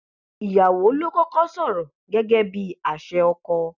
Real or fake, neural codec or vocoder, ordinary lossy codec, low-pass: real; none; none; 7.2 kHz